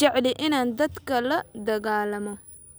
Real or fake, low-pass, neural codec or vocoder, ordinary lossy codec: real; none; none; none